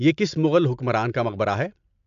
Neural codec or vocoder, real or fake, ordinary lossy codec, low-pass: none; real; MP3, 64 kbps; 7.2 kHz